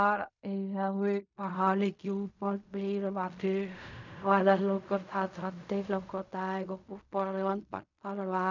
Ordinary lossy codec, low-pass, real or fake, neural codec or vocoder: none; 7.2 kHz; fake; codec, 16 kHz in and 24 kHz out, 0.4 kbps, LongCat-Audio-Codec, fine tuned four codebook decoder